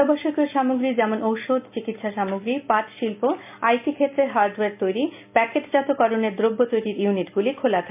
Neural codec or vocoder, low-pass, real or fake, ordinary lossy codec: none; 3.6 kHz; real; MP3, 32 kbps